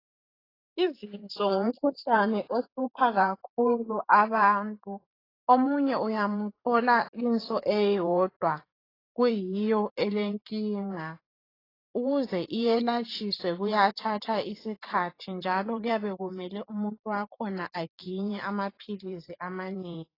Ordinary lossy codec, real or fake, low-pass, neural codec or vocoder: AAC, 24 kbps; fake; 5.4 kHz; vocoder, 44.1 kHz, 128 mel bands every 512 samples, BigVGAN v2